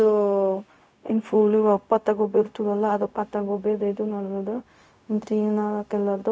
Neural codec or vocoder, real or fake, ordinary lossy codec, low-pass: codec, 16 kHz, 0.4 kbps, LongCat-Audio-Codec; fake; none; none